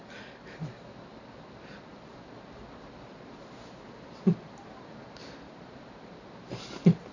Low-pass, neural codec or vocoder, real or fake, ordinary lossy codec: 7.2 kHz; none; real; none